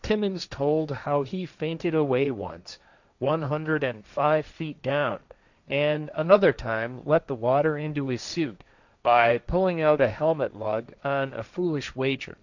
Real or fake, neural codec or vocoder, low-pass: fake; codec, 16 kHz, 1.1 kbps, Voila-Tokenizer; 7.2 kHz